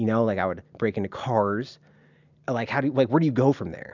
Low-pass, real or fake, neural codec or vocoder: 7.2 kHz; real; none